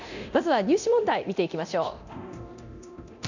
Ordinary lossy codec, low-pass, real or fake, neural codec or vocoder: none; 7.2 kHz; fake; codec, 24 kHz, 0.9 kbps, DualCodec